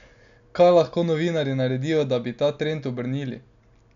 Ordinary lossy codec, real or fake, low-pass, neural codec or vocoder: MP3, 96 kbps; real; 7.2 kHz; none